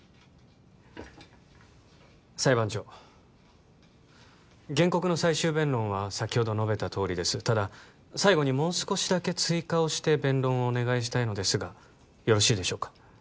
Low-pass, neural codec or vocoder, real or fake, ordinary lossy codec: none; none; real; none